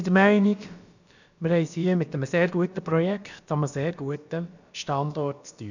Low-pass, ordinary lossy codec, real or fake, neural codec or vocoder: 7.2 kHz; none; fake; codec, 16 kHz, about 1 kbps, DyCAST, with the encoder's durations